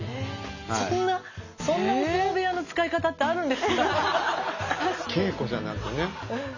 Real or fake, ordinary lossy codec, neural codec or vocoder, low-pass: real; none; none; 7.2 kHz